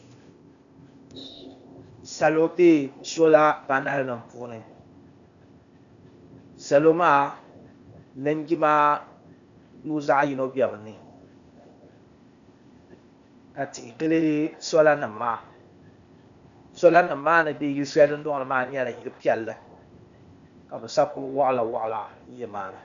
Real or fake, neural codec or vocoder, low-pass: fake; codec, 16 kHz, 0.8 kbps, ZipCodec; 7.2 kHz